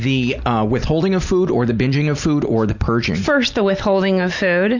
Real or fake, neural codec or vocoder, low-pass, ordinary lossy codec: real; none; 7.2 kHz; Opus, 64 kbps